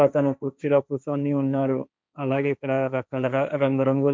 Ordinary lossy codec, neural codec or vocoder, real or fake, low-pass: none; codec, 16 kHz, 1.1 kbps, Voila-Tokenizer; fake; none